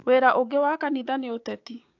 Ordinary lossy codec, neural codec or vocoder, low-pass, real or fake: AAC, 48 kbps; codec, 16 kHz, 6 kbps, DAC; 7.2 kHz; fake